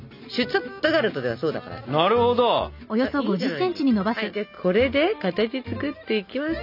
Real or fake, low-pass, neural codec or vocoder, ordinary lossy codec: real; 5.4 kHz; none; none